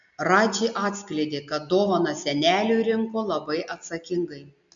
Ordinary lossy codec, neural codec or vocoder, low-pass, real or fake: MP3, 64 kbps; none; 7.2 kHz; real